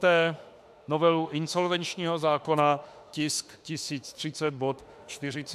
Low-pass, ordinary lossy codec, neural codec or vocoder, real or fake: 14.4 kHz; AAC, 96 kbps; autoencoder, 48 kHz, 32 numbers a frame, DAC-VAE, trained on Japanese speech; fake